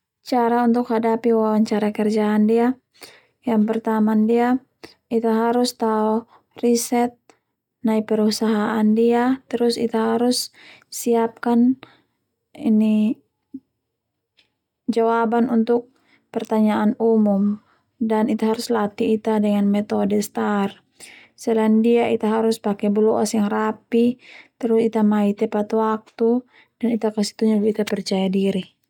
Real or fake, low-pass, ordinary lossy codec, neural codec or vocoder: real; 19.8 kHz; none; none